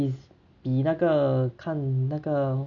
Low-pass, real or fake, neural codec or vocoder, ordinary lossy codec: 7.2 kHz; real; none; none